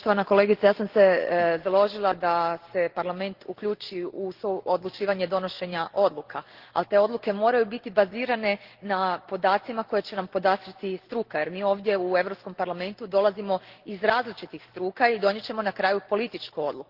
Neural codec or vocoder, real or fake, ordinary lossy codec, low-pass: none; real; Opus, 16 kbps; 5.4 kHz